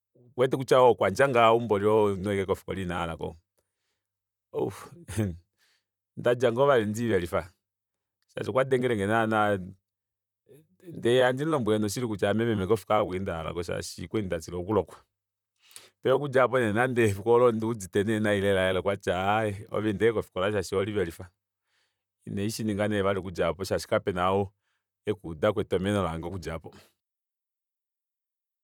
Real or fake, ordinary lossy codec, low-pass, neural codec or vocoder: fake; none; 19.8 kHz; vocoder, 44.1 kHz, 128 mel bands, Pupu-Vocoder